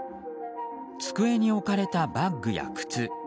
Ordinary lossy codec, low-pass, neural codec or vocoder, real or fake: none; none; none; real